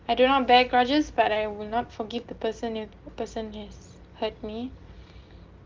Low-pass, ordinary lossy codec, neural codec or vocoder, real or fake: 7.2 kHz; Opus, 32 kbps; none; real